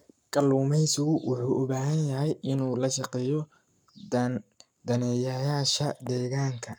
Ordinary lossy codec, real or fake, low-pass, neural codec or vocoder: none; fake; 19.8 kHz; codec, 44.1 kHz, 7.8 kbps, Pupu-Codec